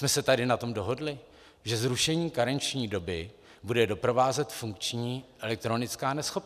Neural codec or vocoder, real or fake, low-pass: none; real; 14.4 kHz